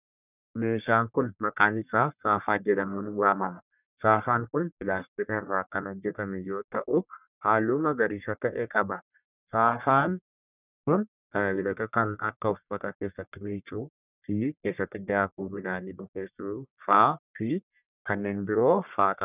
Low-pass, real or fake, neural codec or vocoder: 3.6 kHz; fake; codec, 44.1 kHz, 1.7 kbps, Pupu-Codec